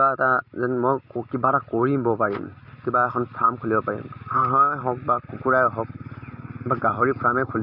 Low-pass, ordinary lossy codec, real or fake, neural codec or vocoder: 5.4 kHz; none; real; none